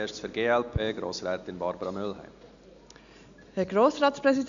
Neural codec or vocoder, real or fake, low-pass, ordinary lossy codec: none; real; 7.2 kHz; none